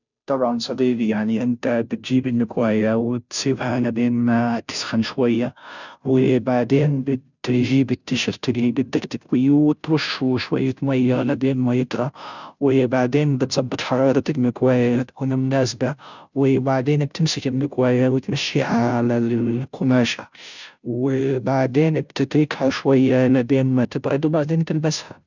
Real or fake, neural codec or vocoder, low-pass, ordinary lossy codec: fake; codec, 16 kHz, 0.5 kbps, FunCodec, trained on Chinese and English, 25 frames a second; 7.2 kHz; none